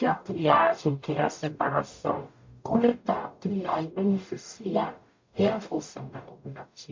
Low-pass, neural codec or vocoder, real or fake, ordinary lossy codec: 7.2 kHz; codec, 44.1 kHz, 0.9 kbps, DAC; fake; MP3, 48 kbps